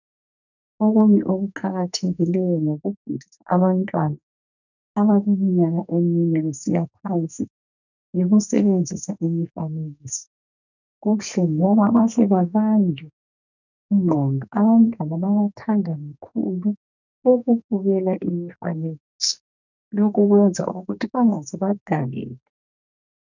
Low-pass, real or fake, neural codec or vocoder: 7.2 kHz; fake; codec, 44.1 kHz, 2.6 kbps, SNAC